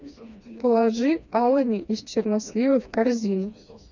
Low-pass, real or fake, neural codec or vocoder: 7.2 kHz; fake; codec, 16 kHz, 2 kbps, FreqCodec, smaller model